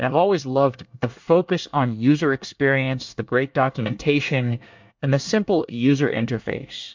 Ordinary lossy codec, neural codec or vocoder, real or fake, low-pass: MP3, 64 kbps; codec, 24 kHz, 1 kbps, SNAC; fake; 7.2 kHz